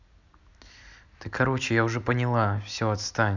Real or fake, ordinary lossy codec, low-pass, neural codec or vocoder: real; none; 7.2 kHz; none